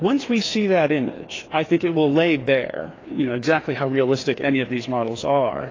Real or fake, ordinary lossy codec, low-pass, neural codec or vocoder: fake; AAC, 32 kbps; 7.2 kHz; codec, 16 kHz, 2 kbps, FreqCodec, larger model